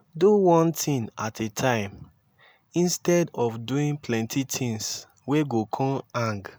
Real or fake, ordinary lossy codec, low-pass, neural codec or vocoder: real; none; none; none